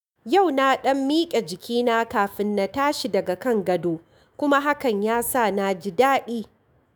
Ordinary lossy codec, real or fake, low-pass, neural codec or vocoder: none; fake; none; autoencoder, 48 kHz, 128 numbers a frame, DAC-VAE, trained on Japanese speech